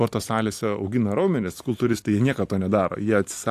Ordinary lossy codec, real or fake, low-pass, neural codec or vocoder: AAC, 64 kbps; fake; 14.4 kHz; vocoder, 44.1 kHz, 128 mel bands every 256 samples, BigVGAN v2